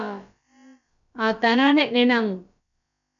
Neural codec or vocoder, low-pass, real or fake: codec, 16 kHz, about 1 kbps, DyCAST, with the encoder's durations; 7.2 kHz; fake